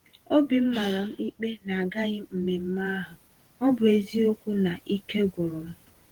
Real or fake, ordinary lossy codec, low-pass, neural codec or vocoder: fake; Opus, 16 kbps; 19.8 kHz; vocoder, 48 kHz, 128 mel bands, Vocos